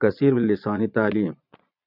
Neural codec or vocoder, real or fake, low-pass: vocoder, 22.05 kHz, 80 mel bands, Vocos; fake; 5.4 kHz